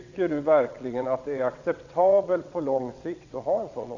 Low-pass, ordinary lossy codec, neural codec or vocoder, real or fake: 7.2 kHz; none; vocoder, 22.05 kHz, 80 mel bands, WaveNeXt; fake